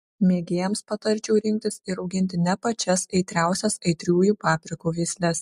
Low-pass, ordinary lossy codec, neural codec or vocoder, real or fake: 14.4 kHz; MP3, 64 kbps; none; real